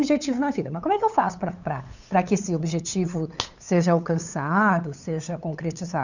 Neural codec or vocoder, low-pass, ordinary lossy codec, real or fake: codec, 16 kHz, 8 kbps, FunCodec, trained on LibriTTS, 25 frames a second; 7.2 kHz; none; fake